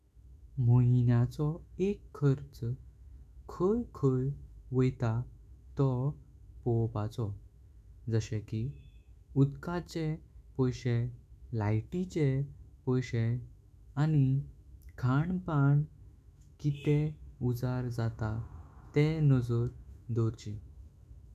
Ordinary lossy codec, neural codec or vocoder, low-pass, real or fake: none; autoencoder, 48 kHz, 128 numbers a frame, DAC-VAE, trained on Japanese speech; 14.4 kHz; fake